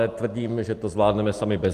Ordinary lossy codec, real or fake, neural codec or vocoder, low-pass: Opus, 32 kbps; real; none; 14.4 kHz